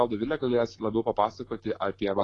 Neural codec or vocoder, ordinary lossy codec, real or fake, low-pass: autoencoder, 48 kHz, 128 numbers a frame, DAC-VAE, trained on Japanese speech; AAC, 32 kbps; fake; 10.8 kHz